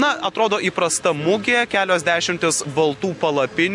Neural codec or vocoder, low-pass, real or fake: none; 10.8 kHz; real